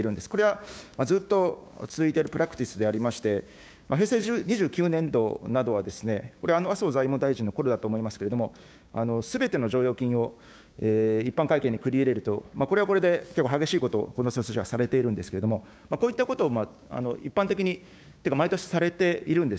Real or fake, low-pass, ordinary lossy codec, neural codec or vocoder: fake; none; none; codec, 16 kHz, 6 kbps, DAC